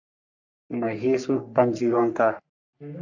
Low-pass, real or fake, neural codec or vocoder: 7.2 kHz; fake; codec, 44.1 kHz, 3.4 kbps, Pupu-Codec